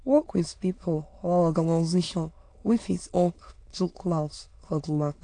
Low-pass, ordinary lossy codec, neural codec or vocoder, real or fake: 9.9 kHz; AAC, 48 kbps; autoencoder, 22.05 kHz, a latent of 192 numbers a frame, VITS, trained on many speakers; fake